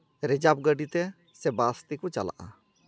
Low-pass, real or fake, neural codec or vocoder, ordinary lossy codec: none; real; none; none